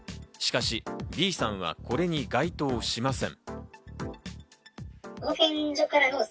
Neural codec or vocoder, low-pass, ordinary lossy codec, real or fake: none; none; none; real